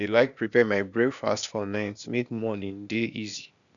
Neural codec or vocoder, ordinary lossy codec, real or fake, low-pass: codec, 16 kHz, 0.8 kbps, ZipCodec; none; fake; 7.2 kHz